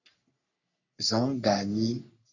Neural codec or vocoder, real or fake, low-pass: codec, 44.1 kHz, 3.4 kbps, Pupu-Codec; fake; 7.2 kHz